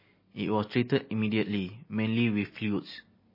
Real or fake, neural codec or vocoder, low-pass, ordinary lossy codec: real; none; 5.4 kHz; MP3, 24 kbps